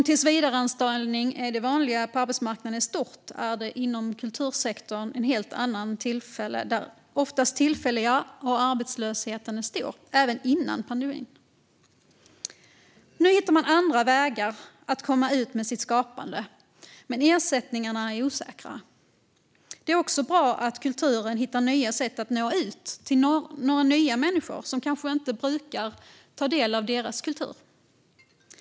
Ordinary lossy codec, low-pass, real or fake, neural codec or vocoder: none; none; real; none